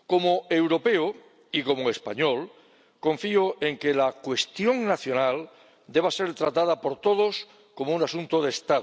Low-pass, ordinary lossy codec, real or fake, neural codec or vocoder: none; none; real; none